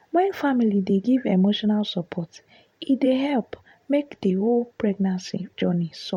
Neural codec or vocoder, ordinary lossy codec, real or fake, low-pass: vocoder, 44.1 kHz, 128 mel bands every 512 samples, BigVGAN v2; MP3, 64 kbps; fake; 19.8 kHz